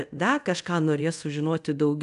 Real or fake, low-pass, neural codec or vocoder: fake; 10.8 kHz; codec, 24 kHz, 0.5 kbps, DualCodec